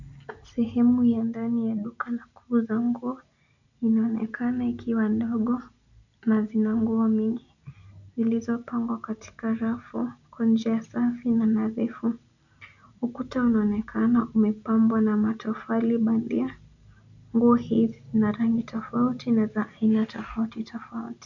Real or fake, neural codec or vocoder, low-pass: real; none; 7.2 kHz